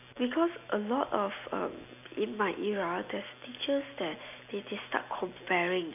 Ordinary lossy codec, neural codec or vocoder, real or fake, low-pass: none; none; real; 3.6 kHz